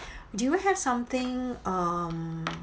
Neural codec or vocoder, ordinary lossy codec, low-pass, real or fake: none; none; none; real